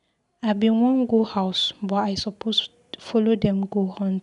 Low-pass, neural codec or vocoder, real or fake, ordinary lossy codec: 10.8 kHz; none; real; none